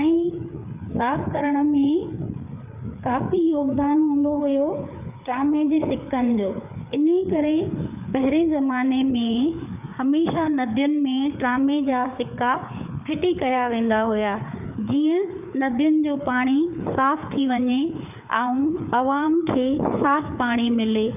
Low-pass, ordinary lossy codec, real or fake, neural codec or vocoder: 3.6 kHz; none; fake; codec, 16 kHz, 4 kbps, FreqCodec, larger model